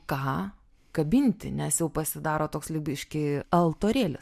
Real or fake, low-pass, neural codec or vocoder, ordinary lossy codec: real; 14.4 kHz; none; MP3, 96 kbps